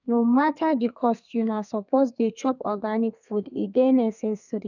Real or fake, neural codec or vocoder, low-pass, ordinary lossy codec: fake; codec, 32 kHz, 1.9 kbps, SNAC; 7.2 kHz; none